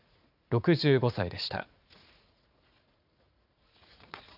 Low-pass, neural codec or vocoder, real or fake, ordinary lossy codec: 5.4 kHz; vocoder, 44.1 kHz, 80 mel bands, Vocos; fake; none